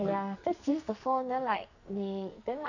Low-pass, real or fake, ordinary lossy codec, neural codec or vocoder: 7.2 kHz; fake; none; codec, 44.1 kHz, 2.6 kbps, SNAC